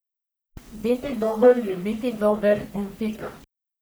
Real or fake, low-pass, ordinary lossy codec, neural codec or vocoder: fake; none; none; codec, 44.1 kHz, 1.7 kbps, Pupu-Codec